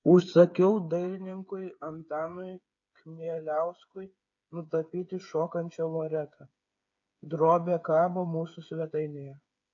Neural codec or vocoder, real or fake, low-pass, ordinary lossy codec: codec, 16 kHz, 8 kbps, FreqCodec, smaller model; fake; 7.2 kHz; AAC, 48 kbps